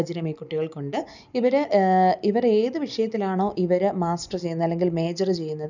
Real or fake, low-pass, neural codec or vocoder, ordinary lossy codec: real; 7.2 kHz; none; none